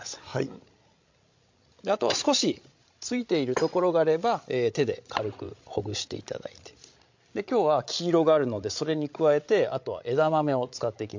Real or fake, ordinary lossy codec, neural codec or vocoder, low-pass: fake; MP3, 48 kbps; codec, 16 kHz, 16 kbps, FreqCodec, larger model; 7.2 kHz